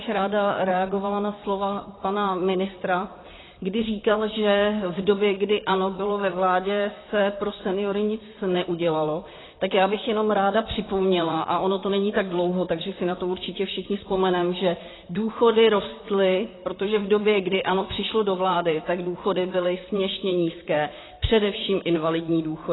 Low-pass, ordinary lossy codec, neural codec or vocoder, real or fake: 7.2 kHz; AAC, 16 kbps; vocoder, 44.1 kHz, 128 mel bands, Pupu-Vocoder; fake